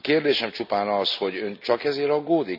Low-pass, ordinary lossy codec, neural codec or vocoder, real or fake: 5.4 kHz; none; none; real